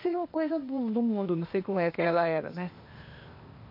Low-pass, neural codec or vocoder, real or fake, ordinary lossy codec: 5.4 kHz; codec, 16 kHz, 0.8 kbps, ZipCodec; fake; MP3, 32 kbps